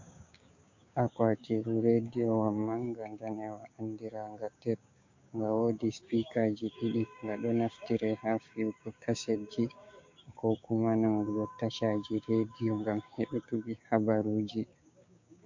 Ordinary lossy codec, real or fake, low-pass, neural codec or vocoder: MP3, 48 kbps; fake; 7.2 kHz; codec, 16 kHz, 6 kbps, DAC